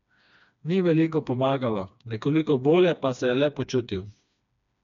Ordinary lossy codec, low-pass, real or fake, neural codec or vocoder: none; 7.2 kHz; fake; codec, 16 kHz, 2 kbps, FreqCodec, smaller model